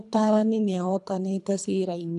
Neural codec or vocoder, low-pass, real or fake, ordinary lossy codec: codec, 24 kHz, 3 kbps, HILCodec; 10.8 kHz; fake; none